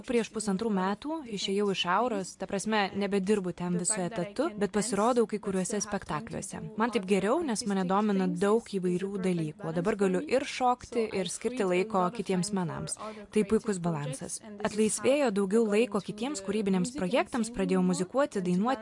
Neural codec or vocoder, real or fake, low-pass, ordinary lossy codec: none; real; 10.8 kHz; MP3, 64 kbps